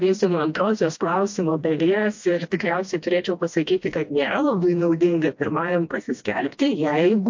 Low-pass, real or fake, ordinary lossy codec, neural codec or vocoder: 7.2 kHz; fake; MP3, 48 kbps; codec, 16 kHz, 1 kbps, FreqCodec, smaller model